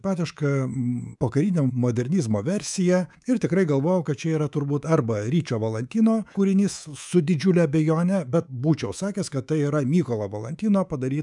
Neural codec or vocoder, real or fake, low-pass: codec, 24 kHz, 3.1 kbps, DualCodec; fake; 10.8 kHz